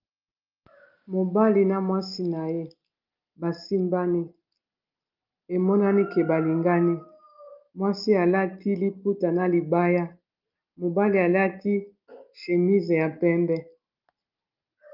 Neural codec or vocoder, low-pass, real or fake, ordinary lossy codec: none; 5.4 kHz; real; Opus, 24 kbps